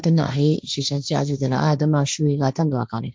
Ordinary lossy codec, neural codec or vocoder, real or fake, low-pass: none; codec, 16 kHz, 1.1 kbps, Voila-Tokenizer; fake; none